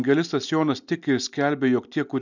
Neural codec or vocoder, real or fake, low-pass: none; real; 7.2 kHz